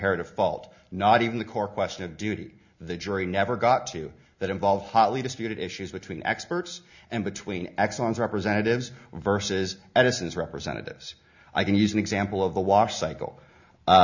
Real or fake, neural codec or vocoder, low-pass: real; none; 7.2 kHz